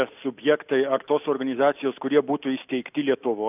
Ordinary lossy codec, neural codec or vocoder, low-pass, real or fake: AAC, 32 kbps; none; 3.6 kHz; real